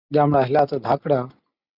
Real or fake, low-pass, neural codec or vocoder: real; 5.4 kHz; none